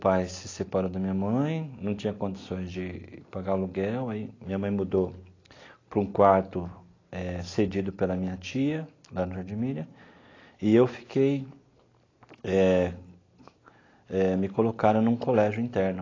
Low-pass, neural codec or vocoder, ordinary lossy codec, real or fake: 7.2 kHz; none; AAC, 32 kbps; real